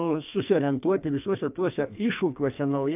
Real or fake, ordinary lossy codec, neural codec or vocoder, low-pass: fake; AAC, 32 kbps; codec, 44.1 kHz, 2.6 kbps, SNAC; 3.6 kHz